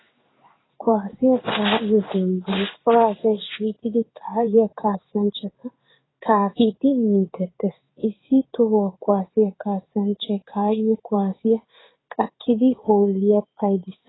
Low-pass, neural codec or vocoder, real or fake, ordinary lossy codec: 7.2 kHz; codec, 16 kHz in and 24 kHz out, 1 kbps, XY-Tokenizer; fake; AAC, 16 kbps